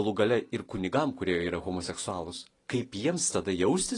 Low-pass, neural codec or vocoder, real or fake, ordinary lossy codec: 10.8 kHz; none; real; AAC, 32 kbps